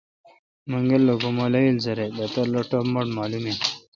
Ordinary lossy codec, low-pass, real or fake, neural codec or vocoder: MP3, 64 kbps; 7.2 kHz; real; none